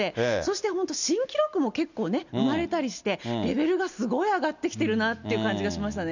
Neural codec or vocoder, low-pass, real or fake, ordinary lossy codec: none; 7.2 kHz; real; none